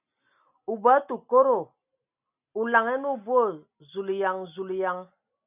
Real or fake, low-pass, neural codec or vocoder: real; 3.6 kHz; none